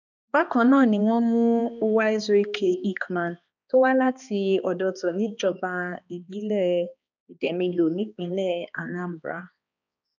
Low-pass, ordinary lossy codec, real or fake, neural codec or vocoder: 7.2 kHz; none; fake; codec, 16 kHz, 2 kbps, X-Codec, HuBERT features, trained on balanced general audio